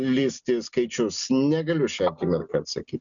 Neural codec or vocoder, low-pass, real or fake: none; 7.2 kHz; real